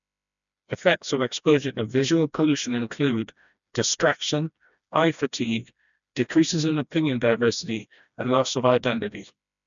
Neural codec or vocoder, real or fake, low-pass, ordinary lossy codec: codec, 16 kHz, 1 kbps, FreqCodec, smaller model; fake; 7.2 kHz; none